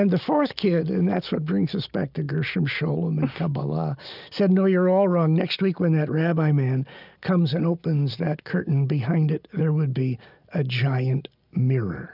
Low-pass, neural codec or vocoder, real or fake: 5.4 kHz; none; real